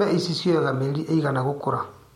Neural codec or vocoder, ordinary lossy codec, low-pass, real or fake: none; MP3, 64 kbps; 19.8 kHz; real